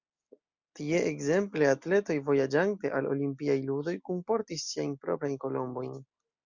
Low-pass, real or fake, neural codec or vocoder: 7.2 kHz; real; none